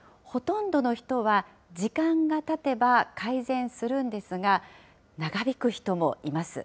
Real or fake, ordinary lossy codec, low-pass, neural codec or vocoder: real; none; none; none